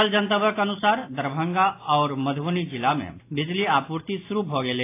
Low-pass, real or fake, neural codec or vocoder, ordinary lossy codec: 3.6 kHz; real; none; AAC, 24 kbps